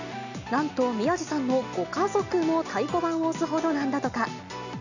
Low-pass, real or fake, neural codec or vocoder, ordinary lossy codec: 7.2 kHz; real; none; none